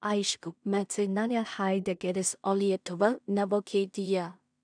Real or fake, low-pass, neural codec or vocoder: fake; 9.9 kHz; codec, 16 kHz in and 24 kHz out, 0.4 kbps, LongCat-Audio-Codec, two codebook decoder